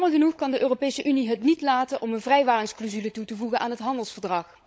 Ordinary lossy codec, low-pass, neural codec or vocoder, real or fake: none; none; codec, 16 kHz, 16 kbps, FunCodec, trained on LibriTTS, 50 frames a second; fake